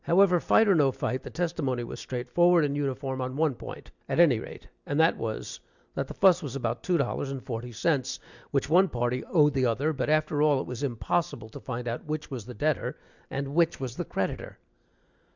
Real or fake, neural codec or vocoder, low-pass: real; none; 7.2 kHz